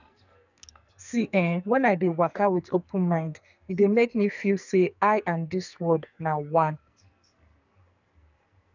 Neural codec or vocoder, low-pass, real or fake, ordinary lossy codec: codec, 44.1 kHz, 2.6 kbps, SNAC; 7.2 kHz; fake; none